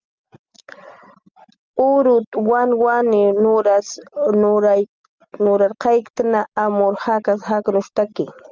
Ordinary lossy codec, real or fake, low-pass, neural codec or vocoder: Opus, 24 kbps; real; 7.2 kHz; none